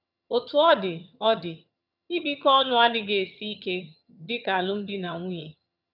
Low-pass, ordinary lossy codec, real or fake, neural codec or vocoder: 5.4 kHz; none; fake; vocoder, 22.05 kHz, 80 mel bands, HiFi-GAN